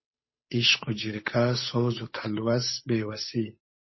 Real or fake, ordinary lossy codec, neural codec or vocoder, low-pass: fake; MP3, 24 kbps; codec, 16 kHz, 8 kbps, FunCodec, trained on Chinese and English, 25 frames a second; 7.2 kHz